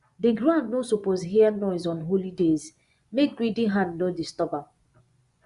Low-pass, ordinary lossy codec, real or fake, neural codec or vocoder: 10.8 kHz; Opus, 64 kbps; fake; vocoder, 24 kHz, 100 mel bands, Vocos